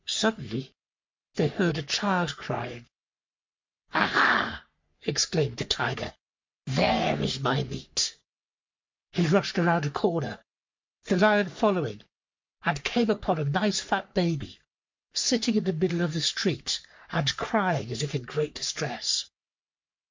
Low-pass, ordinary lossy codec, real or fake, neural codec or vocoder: 7.2 kHz; MP3, 48 kbps; fake; codec, 44.1 kHz, 3.4 kbps, Pupu-Codec